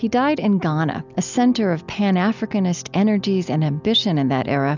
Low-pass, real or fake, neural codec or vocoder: 7.2 kHz; real; none